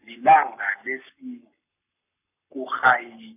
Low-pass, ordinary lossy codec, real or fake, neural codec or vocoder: 3.6 kHz; none; fake; codec, 44.1 kHz, 7.8 kbps, Pupu-Codec